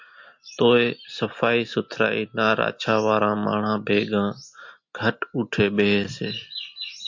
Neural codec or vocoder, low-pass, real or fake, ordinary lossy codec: none; 7.2 kHz; real; MP3, 48 kbps